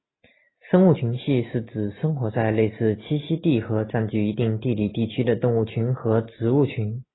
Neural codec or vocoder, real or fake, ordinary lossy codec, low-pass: none; real; AAC, 16 kbps; 7.2 kHz